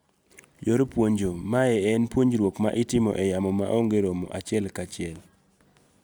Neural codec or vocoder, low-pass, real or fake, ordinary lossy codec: none; none; real; none